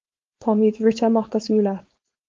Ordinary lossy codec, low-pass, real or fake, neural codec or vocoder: Opus, 32 kbps; 7.2 kHz; fake; codec, 16 kHz, 4.8 kbps, FACodec